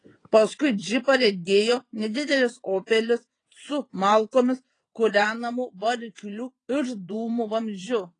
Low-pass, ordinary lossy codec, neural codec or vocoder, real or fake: 9.9 kHz; AAC, 32 kbps; vocoder, 22.05 kHz, 80 mel bands, WaveNeXt; fake